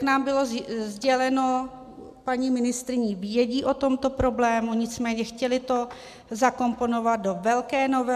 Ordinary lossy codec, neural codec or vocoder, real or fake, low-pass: MP3, 96 kbps; none; real; 14.4 kHz